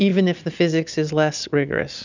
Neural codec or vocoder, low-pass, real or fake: none; 7.2 kHz; real